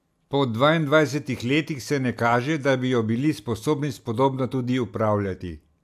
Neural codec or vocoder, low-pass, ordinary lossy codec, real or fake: none; 14.4 kHz; AAC, 96 kbps; real